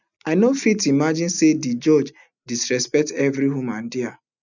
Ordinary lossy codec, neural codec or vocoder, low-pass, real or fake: none; none; 7.2 kHz; real